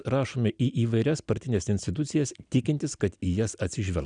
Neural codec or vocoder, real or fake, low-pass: none; real; 9.9 kHz